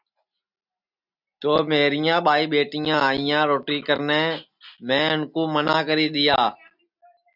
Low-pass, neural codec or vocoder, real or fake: 5.4 kHz; none; real